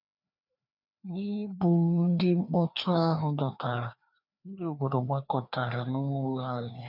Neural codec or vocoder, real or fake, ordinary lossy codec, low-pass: codec, 16 kHz, 2 kbps, FreqCodec, larger model; fake; none; 5.4 kHz